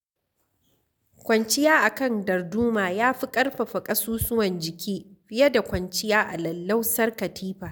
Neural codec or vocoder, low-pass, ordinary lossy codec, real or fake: none; none; none; real